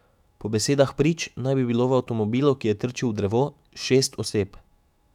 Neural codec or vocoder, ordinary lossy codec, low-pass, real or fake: autoencoder, 48 kHz, 128 numbers a frame, DAC-VAE, trained on Japanese speech; none; 19.8 kHz; fake